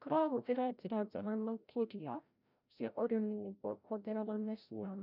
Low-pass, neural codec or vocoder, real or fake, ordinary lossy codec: 5.4 kHz; codec, 16 kHz, 0.5 kbps, FreqCodec, larger model; fake; none